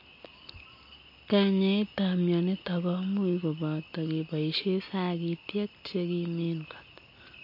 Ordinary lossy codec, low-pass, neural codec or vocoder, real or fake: MP3, 32 kbps; 5.4 kHz; none; real